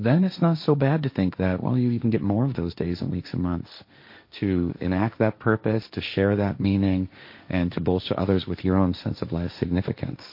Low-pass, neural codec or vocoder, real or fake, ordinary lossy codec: 5.4 kHz; codec, 16 kHz, 1.1 kbps, Voila-Tokenizer; fake; MP3, 32 kbps